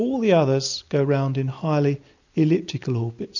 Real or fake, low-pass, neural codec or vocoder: real; 7.2 kHz; none